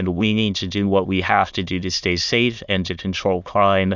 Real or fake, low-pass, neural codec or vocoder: fake; 7.2 kHz; autoencoder, 22.05 kHz, a latent of 192 numbers a frame, VITS, trained on many speakers